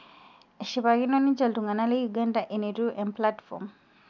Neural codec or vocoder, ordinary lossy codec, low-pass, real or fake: none; none; 7.2 kHz; real